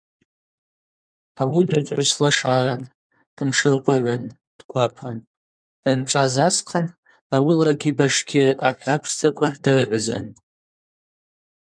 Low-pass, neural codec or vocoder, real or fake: 9.9 kHz; codec, 24 kHz, 1 kbps, SNAC; fake